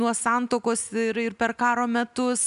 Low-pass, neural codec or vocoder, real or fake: 10.8 kHz; none; real